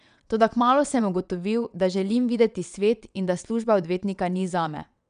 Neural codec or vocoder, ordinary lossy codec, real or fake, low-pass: vocoder, 22.05 kHz, 80 mel bands, WaveNeXt; none; fake; 9.9 kHz